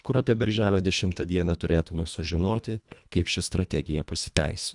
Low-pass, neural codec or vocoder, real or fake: 10.8 kHz; codec, 24 kHz, 1.5 kbps, HILCodec; fake